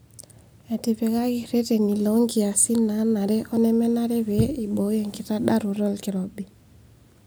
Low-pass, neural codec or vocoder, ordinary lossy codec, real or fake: none; none; none; real